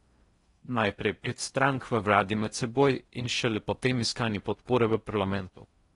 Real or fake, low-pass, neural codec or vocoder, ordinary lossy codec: fake; 10.8 kHz; codec, 16 kHz in and 24 kHz out, 0.6 kbps, FocalCodec, streaming, 4096 codes; AAC, 32 kbps